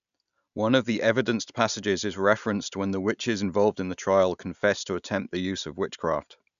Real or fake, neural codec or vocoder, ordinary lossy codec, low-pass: real; none; none; 7.2 kHz